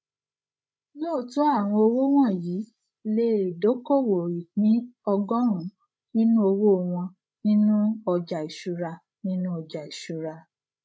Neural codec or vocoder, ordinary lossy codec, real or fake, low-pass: codec, 16 kHz, 16 kbps, FreqCodec, larger model; none; fake; none